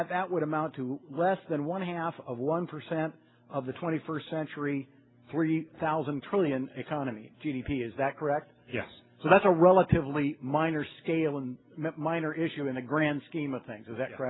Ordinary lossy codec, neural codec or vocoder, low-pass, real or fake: AAC, 16 kbps; none; 7.2 kHz; real